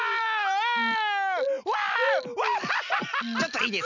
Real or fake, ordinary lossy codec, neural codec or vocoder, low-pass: real; none; none; 7.2 kHz